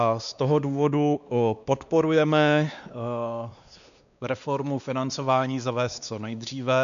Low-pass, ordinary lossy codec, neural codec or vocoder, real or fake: 7.2 kHz; MP3, 96 kbps; codec, 16 kHz, 2 kbps, X-Codec, WavLM features, trained on Multilingual LibriSpeech; fake